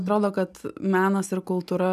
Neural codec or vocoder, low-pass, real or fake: none; 14.4 kHz; real